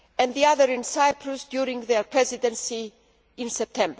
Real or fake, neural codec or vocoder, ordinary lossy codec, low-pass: real; none; none; none